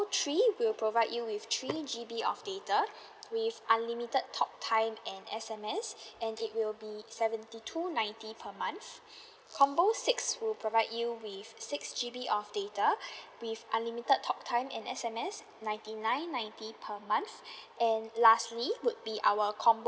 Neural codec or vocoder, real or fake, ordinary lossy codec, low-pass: none; real; none; none